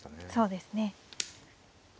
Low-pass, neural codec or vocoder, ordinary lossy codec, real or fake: none; none; none; real